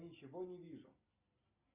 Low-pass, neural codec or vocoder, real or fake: 3.6 kHz; none; real